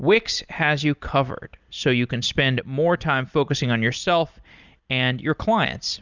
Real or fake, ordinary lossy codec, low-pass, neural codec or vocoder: real; Opus, 64 kbps; 7.2 kHz; none